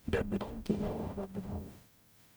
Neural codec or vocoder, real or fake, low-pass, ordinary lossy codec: codec, 44.1 kHz, 0.9 kbps, DAC; fake; none; none